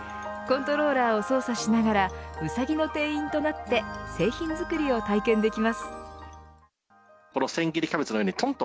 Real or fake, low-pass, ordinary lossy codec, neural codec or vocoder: real; none; none; none